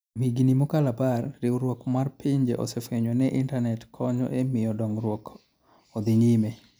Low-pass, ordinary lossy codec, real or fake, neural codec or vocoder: none; none; real; none